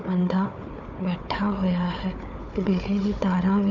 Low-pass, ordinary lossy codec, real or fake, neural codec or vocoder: 7.2 kHz; none; fake; codec, 16 kHz, 4 kbps, FunCodec, trained on Chinese and English, 50 frames a second